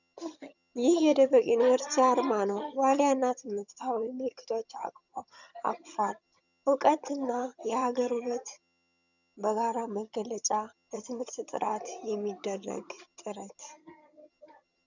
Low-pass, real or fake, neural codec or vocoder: 7.2 kHz; fake; vocoder, 22.05 kHz, 80 mel bands, HiFi-GAN